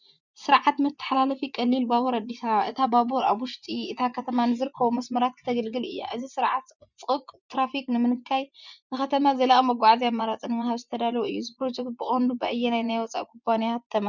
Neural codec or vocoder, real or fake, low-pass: vocoder, 24 kHz, 100 mel bands, Vocos; fake; 7.2 kHz